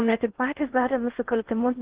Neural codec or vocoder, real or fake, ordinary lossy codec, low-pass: codec, 16 kHz in and 24 kHz out, 0.6 kbps, FocalCodec, streaming, 2048 codes; fake; Opus, 16 kbps; 3.6 kHz